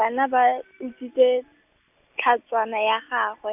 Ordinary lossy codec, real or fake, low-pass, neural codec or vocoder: none; real; 3.6 kHz; none